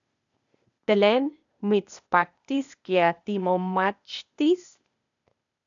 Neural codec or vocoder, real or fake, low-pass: codec, 16 kHz, 0.8 kbps, ZipCodec; fake; 7.2 kHz